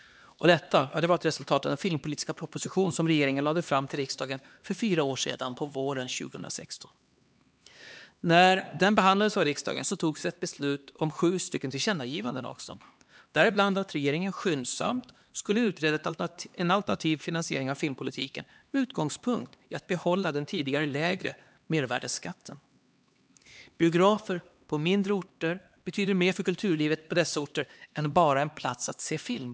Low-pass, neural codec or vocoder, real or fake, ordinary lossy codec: none; codec, 16 kHz, 2 kbps, X-Codec, HuBERT features, trained on LibriSpeech; fake; none